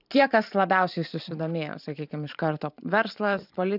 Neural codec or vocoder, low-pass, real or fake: vocoder, 44.1 kHz, 128 mel bands every 512 samples, BigVGAN v2; 5.4 kHz; fake